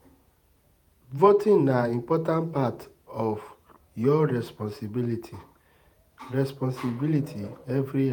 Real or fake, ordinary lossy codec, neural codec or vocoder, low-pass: fake; none; vocoder, 48 kHz, 128 mel bands, Vocos; none